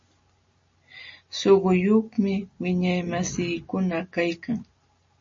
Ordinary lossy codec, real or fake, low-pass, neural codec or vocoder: MP3, 32 kbps; real; 7.2 kHz; none